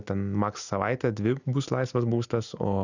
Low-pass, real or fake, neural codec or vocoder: 7.2 kHz; real; none